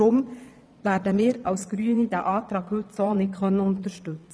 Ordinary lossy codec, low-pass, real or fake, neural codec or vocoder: none; none; fake; vocoder, 22.05 kHz, 80 mel bands, Vocos